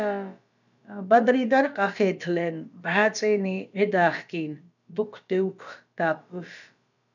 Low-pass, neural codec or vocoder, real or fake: 7.2 kHz; codec, 16 kHz, about 1 kbps, DyCAST, with the encoder's durations; fake